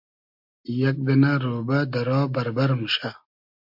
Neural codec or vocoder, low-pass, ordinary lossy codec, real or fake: none; 5.4 kHz; MP3, 48 kbps; real